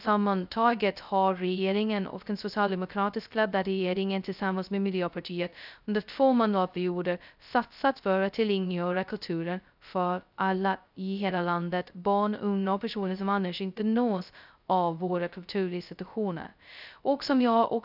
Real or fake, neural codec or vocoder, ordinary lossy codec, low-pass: fake; codec, 16 kHz, 0.2 kbps, FocalCodec; none; 5.4 kHz